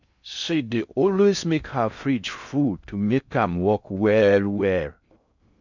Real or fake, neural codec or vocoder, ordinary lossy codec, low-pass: fake; codec, 16 kHz in and 24 kHz out, 0.6 kbps, FocalCodec, streaming, 2048 codes; none; 7.2 kHz